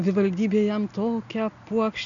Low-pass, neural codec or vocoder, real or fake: 7.2 kHz; none; real